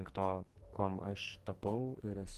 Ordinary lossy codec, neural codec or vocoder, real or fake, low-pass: Opus, 16 kbps; codec, 44.1 kHz, 2.6 kbps, SNAC; fake; 14.4 kHz